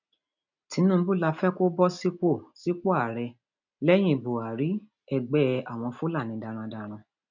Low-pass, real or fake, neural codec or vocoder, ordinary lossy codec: 7.2 kHz; real; none; none